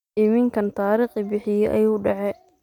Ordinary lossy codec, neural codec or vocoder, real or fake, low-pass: none; none; real; 19.8 kHz